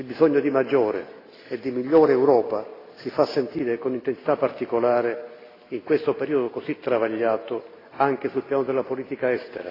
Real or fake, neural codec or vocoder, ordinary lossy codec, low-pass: real; none; AAC, 24 kbps; 5.4 kHz